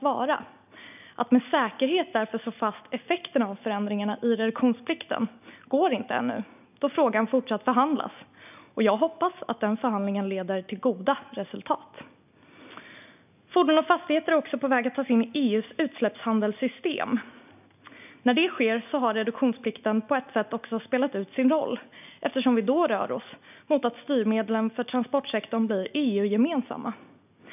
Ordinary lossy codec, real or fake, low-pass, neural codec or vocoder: none; real; 3.6 kHz; none